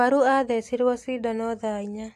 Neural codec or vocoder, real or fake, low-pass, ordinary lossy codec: none; real; 14.4 kHz; AAC, 64 kbps